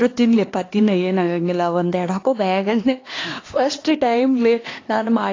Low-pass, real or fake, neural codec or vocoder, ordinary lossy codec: 7.2 kHz; fake; codec, 16 kHz, 2 kbps, X-Codec, HuBERT features, trained on LibriSpeech; AAC, 32 kbps